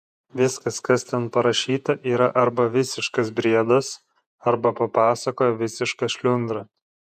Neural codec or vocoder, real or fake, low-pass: vocoder, 48 kHz, 128 mel bands, Vocos; fake; 14.4 kHz